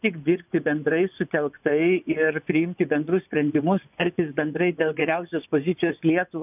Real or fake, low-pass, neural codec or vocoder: fake; 3.6 kHz; vocoder, 24 kHz, 100 mel bands, Vocos